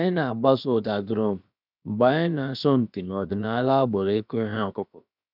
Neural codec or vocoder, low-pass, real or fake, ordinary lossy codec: codec, 16 kHz, about 1 kbps, DyCAST, with the encoder's durations; 5.4 kHz; fake; none